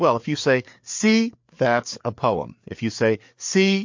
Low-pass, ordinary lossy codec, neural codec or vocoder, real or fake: 7.2 kHz; MP3, 48 kbps; codec, 16 kHz, 4 kbps, FreqCodec, larger model; fake